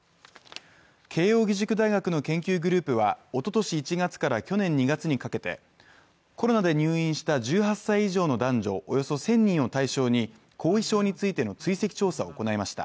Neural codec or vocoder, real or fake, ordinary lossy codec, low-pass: none; real; none; none